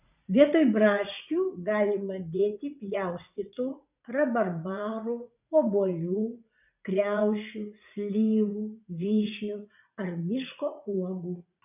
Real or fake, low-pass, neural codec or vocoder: fake; 3.6 kHz; vocoder, 44.1 kHz, 80 mel bands, Vocos